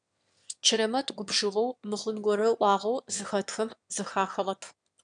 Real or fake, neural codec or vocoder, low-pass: fake; autoencoder, 22.05 kHz, a latent of 192 numbers a frame, VITS, trained on one speaker; 9.9 kHz